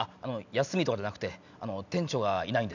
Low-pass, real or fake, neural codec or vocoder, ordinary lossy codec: 7.2 kHz; real; none; none